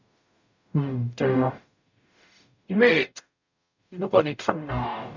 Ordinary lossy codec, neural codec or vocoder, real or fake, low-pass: none; codec, 44.1 kHz, 0.9 kbps, DAC; fake; 7.2 kHz